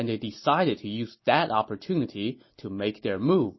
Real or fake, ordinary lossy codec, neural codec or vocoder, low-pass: real; MP3, 24 kbps; none; 7.2 kHz